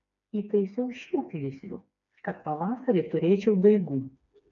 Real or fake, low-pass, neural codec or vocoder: fake; 7.2 kHz; codec, 16 kHz, 2 kbps, FreqCodec, smaller model